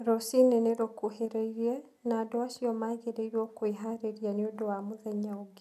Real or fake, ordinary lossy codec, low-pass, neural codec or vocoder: real; none; 14.4 kHz; none